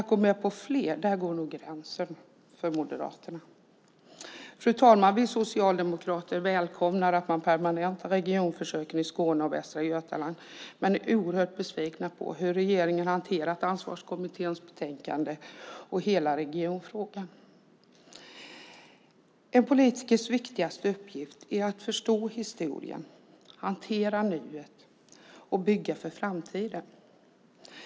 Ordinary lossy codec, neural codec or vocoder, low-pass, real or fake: none; none; none; real